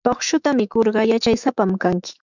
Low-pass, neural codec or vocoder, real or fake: 7.2 kHz; autoencoder, 48 kHz, 128 numbers a frame, DAC-VAE, trained on Japanese speech; fake